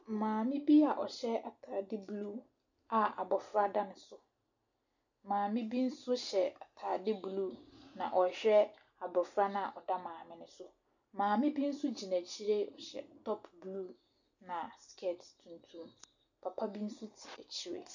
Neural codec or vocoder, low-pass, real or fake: none; 7.2 kHz; real